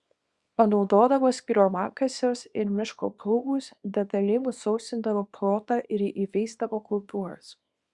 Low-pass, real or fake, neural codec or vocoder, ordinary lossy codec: 10.8 kHz; fake; codec, 24 kHz, 0.9 kbps, WavTokenizer, small release; Opus, 64 kbps